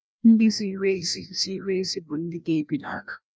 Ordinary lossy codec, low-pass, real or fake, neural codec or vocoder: none; none; fake; codec, 16 kHz, 1 kbps, FreqCodec, larger model